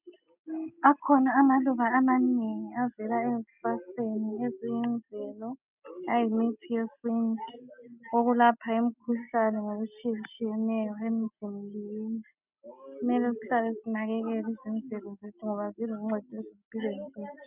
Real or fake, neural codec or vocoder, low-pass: real; none; 3.6 kHz